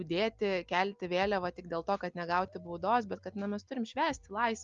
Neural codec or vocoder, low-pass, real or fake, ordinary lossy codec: none; 7.2 kHz; real; Opus, 32 kbps